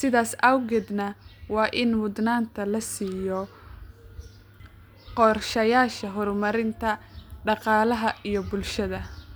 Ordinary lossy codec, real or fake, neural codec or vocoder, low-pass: none; real; none; none